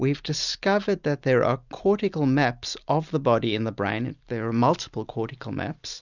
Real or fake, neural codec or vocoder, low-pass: real; none; 7.2 kHz